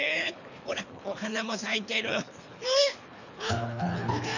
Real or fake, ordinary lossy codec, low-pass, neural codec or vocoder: fake; none; 7.2 kHz; codec, 24 kHz, 3 kbps, HILCodec